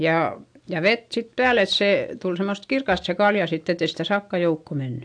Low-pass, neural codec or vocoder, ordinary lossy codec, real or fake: 9.9 kHz; none; AAC, 64 kbps; real